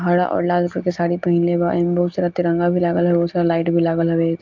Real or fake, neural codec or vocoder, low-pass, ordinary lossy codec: real; none; 7.2 kHz; Opus, 24 kbps